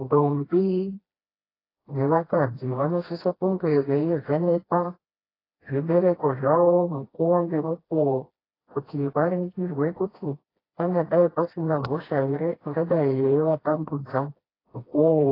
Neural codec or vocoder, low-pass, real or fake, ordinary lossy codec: codec, 16 kHz, 1 kbps, FreqCodec, smaller model; 5.4 kHz; fake; AAC, 24 kbps